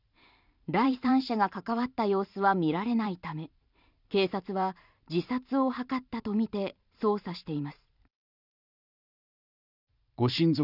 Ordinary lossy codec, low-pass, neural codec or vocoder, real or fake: none; 5.4 kHz; vocoder, 44.1 kHz, 128 mel bands every 512 samples, BigVGAN v2; fake